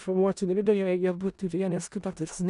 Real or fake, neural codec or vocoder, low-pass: fake; codec, 16 kHz in and 24 kHz out, 0.4 kbps, LongCat-Audio-Codec, four codebook decoder; 10.8 kHz